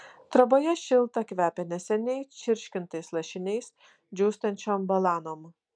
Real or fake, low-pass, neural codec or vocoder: real; 9.9 kHz; none